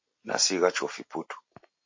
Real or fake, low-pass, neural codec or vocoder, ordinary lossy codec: real; 7.2 kHz; none; MP3, 32 kbps